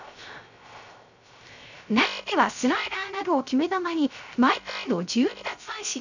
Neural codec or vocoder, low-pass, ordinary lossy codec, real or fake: codec, 16 kHz, 0.3 kbps, FocalCodec; 7.2 kHz; none; fake